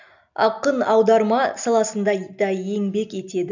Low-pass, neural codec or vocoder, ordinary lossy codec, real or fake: 7.2 kHz; none; none; real